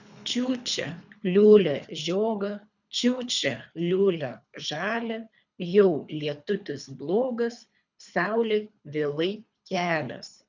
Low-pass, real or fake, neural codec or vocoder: 7.2 kHz; fake; codec, 24 kHz, 3 kbps, HILCodec